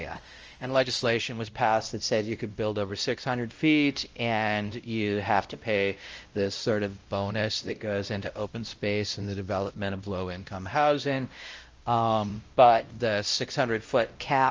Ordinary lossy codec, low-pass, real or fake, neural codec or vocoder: Opus, 24 kbps; 7.2 kHz; fake; codec, 16 kHz, 0.5 kbps, X-Codec, WavLM features, trained on Multilingual LibriSpeech